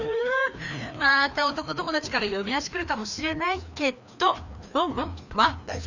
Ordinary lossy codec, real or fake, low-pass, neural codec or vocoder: none; fake; 7.2 kHz; codec, 16 kHz, 2 kbps, FreqCodec, larger model